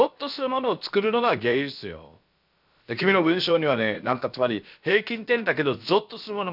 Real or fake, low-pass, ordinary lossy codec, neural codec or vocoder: fake; 5.4 kHz; none; codec, 16 kHz, about 1 kbps, DyCAST, with the encoder's durations